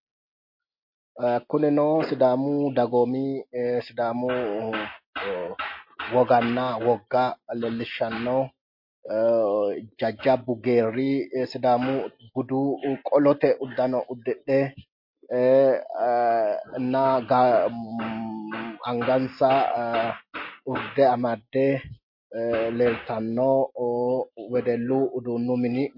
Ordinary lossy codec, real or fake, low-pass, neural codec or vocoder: MP3, 32 kbps; real; 5.4 kHz; none